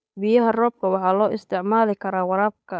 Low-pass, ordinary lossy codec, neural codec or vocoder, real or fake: none; none; codec, 16 kHz, 8 kbps, FunCodec, trained on Chinese and English, 25 frames a second; fake